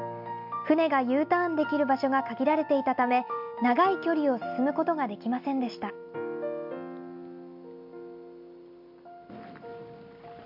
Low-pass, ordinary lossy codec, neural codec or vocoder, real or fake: 5.4 kHz; MP3, 48 kbps; none; real